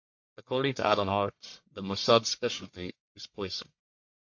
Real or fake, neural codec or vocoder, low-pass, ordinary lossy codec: fake; codec, 44.1 kHz, 1.7 kbps, Pupu-Codec; 7.2 kHz; MP3, 48 kbps